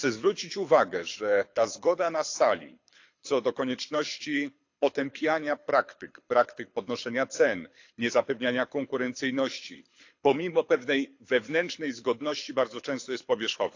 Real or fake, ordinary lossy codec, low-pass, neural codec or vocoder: fake; AAC, 48 kbps; 7.2 kHz; codec, 24 kHz, 6 kbps, HILCodec